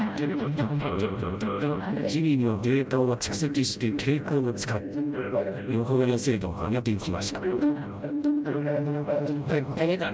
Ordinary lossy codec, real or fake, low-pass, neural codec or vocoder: none; fake; none; codec, 16 kHz, 0.5 kbps, FreqCodec, smaller model